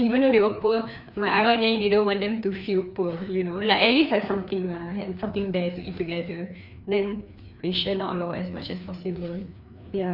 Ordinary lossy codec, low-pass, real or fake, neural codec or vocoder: none; 5.4 kHz; fake; codec, 16 kHz, 2 kbps, FreqCodec, larger model